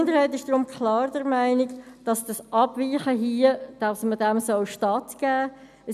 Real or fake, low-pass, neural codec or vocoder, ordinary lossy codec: real; 14.4 kHz; none; none